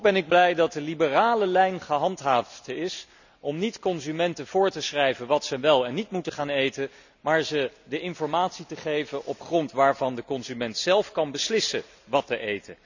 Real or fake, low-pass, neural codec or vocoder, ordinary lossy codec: real; 7.2 kHz; none; none